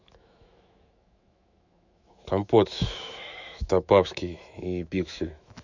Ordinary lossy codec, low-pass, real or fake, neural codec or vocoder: none; 7.2 kHz; fake; autoencoder, 48 kHz, 128 numbers a frame, DAC-VAE, trained on Japanese speech